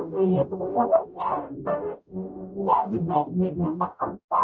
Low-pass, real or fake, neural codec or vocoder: 7.2 kHz; fake; codec, 44.1 kHz, 0.9 kbps, DAC